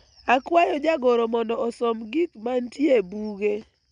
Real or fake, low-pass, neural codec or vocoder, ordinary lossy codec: real; 9.9 kHz; none; none